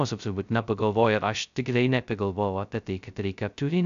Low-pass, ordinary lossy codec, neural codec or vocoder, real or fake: 7.2 kHz; AAC, 96 kbps; codec, 16 kHz, 0.2 kbps, FocalCodec; fake